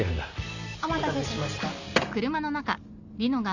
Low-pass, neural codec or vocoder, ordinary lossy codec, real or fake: 7.2 kHz; none; none; real